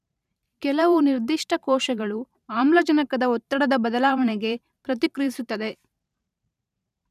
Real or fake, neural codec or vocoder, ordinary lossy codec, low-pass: fake; vocoder, 44.1 kHz, 128 mel bands every 512 samples, BigVGAN v2; none; 14.4 kHz